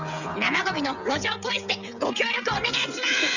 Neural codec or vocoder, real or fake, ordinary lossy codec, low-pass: codec, 16 kHz, 8 kbps, FreqCodec, smaller model; fake; none; 7.2 kHz